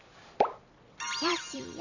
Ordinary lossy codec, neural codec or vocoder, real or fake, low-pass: none; vocoder, 44.1 kHz, 128 mel bands every 256 samples, BigVGAN v2; fake; 7.2 kHz